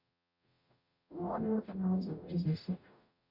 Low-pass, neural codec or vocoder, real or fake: 5.4 kHz; codec, 44.1 kHz, 0.9 kbps, DAC; fake